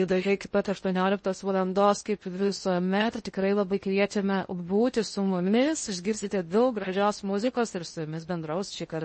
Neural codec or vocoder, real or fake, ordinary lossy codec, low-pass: codec, 16 kHz in and 24 kHz out, 0.6 kbps, FocalCodec, streaming, 2048 codes; fake; MP3, 32 kbps; 10.8 kHz